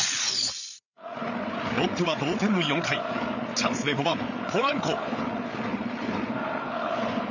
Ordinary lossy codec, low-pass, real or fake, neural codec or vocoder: none; 7.2 kHz; fake; codec, 16 kHz, 8 kbps, FreqCodec, larger model